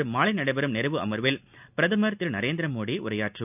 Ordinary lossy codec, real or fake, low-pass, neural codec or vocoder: none; real; 3.6 kHz; none